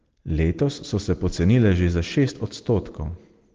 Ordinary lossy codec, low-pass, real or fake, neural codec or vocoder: Opus, 16 kbps; 7.2 kHz; real; none